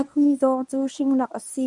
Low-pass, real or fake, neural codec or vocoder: 10.8 kHz; fake; codec, 24 kHz, 0.9 kbps, WavTokenizer, medium speech release version 1